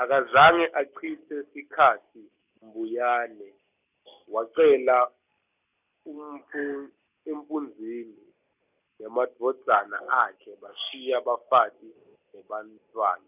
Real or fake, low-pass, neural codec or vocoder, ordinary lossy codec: real; 3.6 kHz; none; none